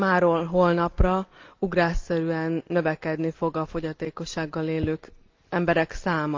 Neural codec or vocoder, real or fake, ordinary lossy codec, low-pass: none; real; Opus, 32 kbps; 7.2 kHz